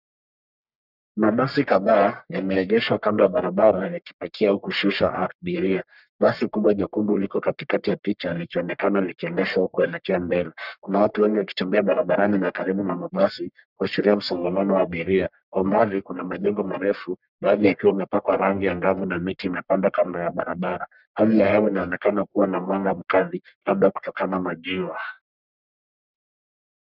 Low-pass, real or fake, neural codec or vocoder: 5.4 kHz; fake; codec, 44.1 kHz, 1.7 kbps, Pupu-Codec